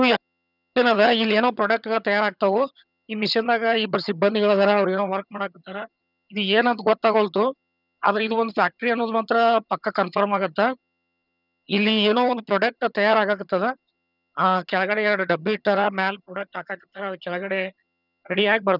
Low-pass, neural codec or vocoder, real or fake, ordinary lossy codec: 5.4 kHz; vocoder, 22.05 kHz, 80 mel bands, HiFi-GAN; fake; none